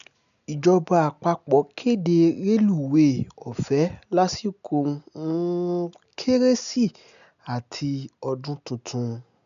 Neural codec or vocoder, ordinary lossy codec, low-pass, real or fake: none; none; 7.2 kHz; real